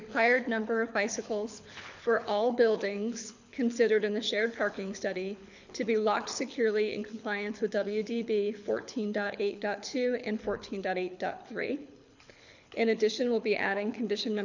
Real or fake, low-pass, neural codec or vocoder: fake; 7.2 kHz; codec, 24 kHz, 6 kbps, HILCodec